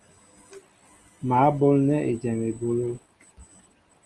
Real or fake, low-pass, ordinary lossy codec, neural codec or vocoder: real; 10.8 kHz; Opus, 24 kbps; none